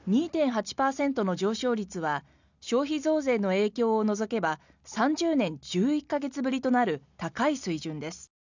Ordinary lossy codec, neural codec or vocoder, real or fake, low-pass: none; none; real; 7.2 kHz